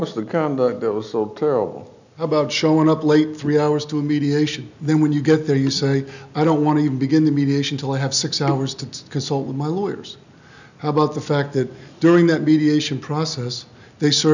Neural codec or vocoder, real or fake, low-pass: none; real; 7.2 kHz